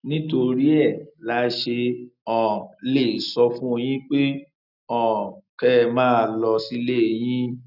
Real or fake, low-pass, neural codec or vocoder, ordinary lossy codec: real; 5.4 kHz; none; none